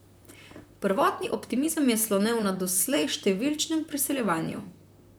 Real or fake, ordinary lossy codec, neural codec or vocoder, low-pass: fake; none; vocoder, 44.1 kHz, 128 mel bands, Pupu-Vocoder; none